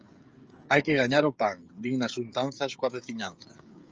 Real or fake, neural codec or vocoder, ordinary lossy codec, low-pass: fake; codec, 16 kHz, 16 kbps, FreqCodec, smaller model; Opus, 24 kbps; 7.2 kHz